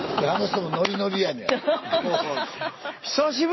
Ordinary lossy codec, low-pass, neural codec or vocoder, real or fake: MP3, 24 kbps; 7.2 kHz; none; real